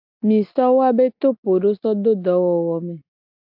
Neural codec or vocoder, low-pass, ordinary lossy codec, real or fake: none; 5.4 kHz; AAC, 48 kbps; real